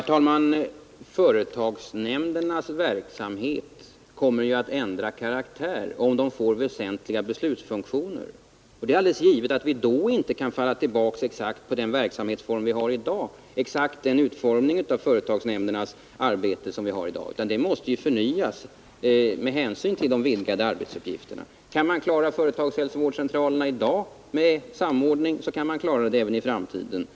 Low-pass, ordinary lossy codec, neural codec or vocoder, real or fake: none; none; none; real